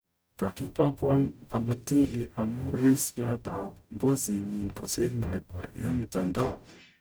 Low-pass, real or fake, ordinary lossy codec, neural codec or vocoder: none; fake; none; codec, 44.1 kHz, 0.9 kbps, DAC